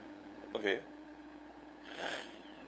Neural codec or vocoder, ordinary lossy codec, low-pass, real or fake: codec, 16 kHz, 8 kbps, FunCodec, trained on LibriTTS, 25 frames a second; none; none; fake